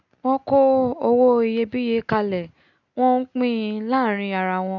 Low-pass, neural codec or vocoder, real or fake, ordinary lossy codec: 7.2 kHz; none; real; none